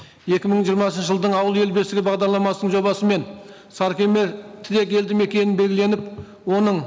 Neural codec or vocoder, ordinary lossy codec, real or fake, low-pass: none; none; real; none